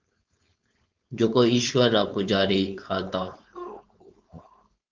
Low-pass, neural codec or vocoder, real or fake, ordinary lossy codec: 7.2 kHz; codec, 16 kHz, 4.8 kbps, FACodec; fake; Opus, 24 kbps